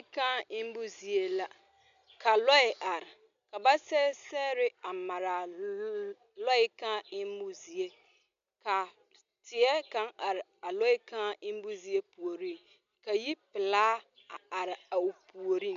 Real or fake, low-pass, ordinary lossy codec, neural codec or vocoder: real; 7.2 kHz; MP3, 64 kbps; none